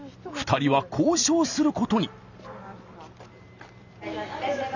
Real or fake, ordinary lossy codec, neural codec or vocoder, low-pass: real; none; none; 7.2 kHz